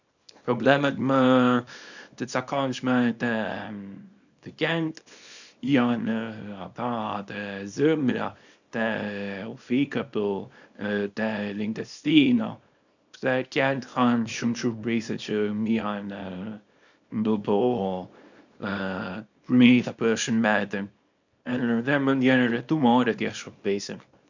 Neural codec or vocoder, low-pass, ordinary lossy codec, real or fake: codec, 24 kHz, 0.9 kbps, WavTokenizer, small release; 7.2 kHz; none; fake